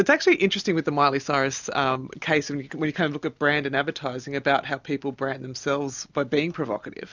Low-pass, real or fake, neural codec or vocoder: 7.2 kHz; real; none